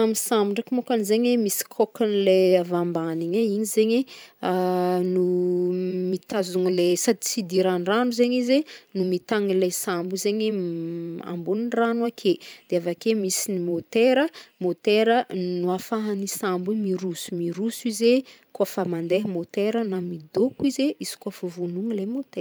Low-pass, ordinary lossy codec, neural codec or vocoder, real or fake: none; none; vocoder, 44.1 kHz, 128 mel bands every 512 samples, BigVGAN v2; fake